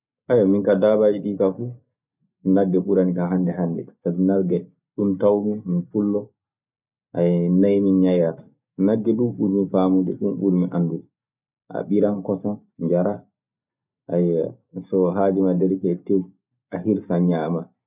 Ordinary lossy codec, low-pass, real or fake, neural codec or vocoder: none; 3.6 kHz; real; none